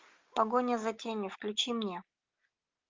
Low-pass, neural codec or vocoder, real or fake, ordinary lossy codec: 7.2 kHz; none; real; Opus, 32 kbps